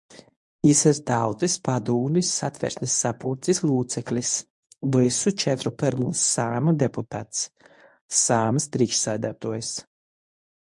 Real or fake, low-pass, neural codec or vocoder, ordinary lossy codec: fake; 10.8 kHz; codec, 24 kHz, 0.9 kbps, WavTokenizer, medium speech release version 1; MP3, 64 kbps